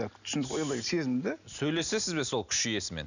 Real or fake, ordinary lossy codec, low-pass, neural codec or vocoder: real; none; 7.2 kHz; none